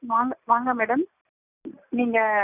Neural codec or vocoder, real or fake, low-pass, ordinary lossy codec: none; real; 3.6 kHz; none